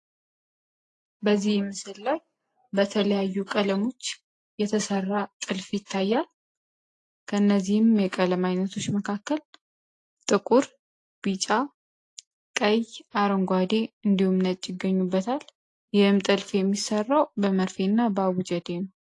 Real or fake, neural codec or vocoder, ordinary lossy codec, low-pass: real; none; AAC, 48 kbps; 10.8 kHz